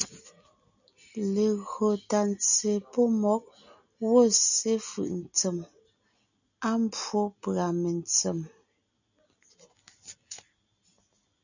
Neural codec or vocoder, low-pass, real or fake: none; 7.2 kHz; real